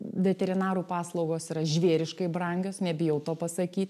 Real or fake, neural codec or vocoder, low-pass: real; none; 14.4 kHz